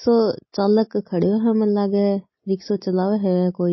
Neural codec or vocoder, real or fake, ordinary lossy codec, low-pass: none; real; MP3, 24 kbps; 7.2 kHz